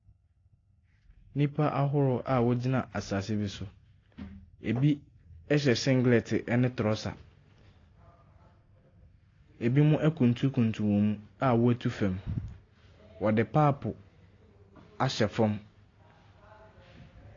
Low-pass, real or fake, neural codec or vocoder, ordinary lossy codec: 7.2 kHz; real; none; AAC, 32 kbps